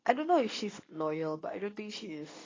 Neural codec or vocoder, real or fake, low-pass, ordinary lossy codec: codec, 24 kHz, 0.9 kbps, WavTokenizer, medium speech release version 2; fake; 7.2 kHz; AAC, 32 kbps